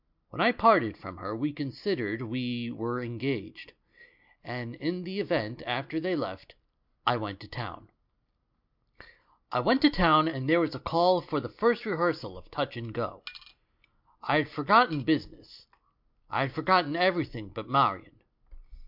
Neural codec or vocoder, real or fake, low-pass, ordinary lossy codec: none; real; 5.4 kHz; MP3, 48 kbps